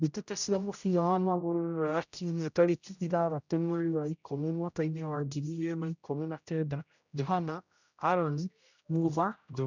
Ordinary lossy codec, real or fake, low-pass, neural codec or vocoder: none; fake; 7.2 kHz; codec, 16 kHz, 0.5 kbps, X-Codec, HuBERT features, trained on general audio